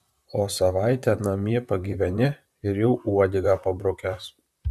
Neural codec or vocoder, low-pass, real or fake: vocoder, 44.1 kHz, 128 mel bands, Pupu-Vocoder; 14.4 kHz; fake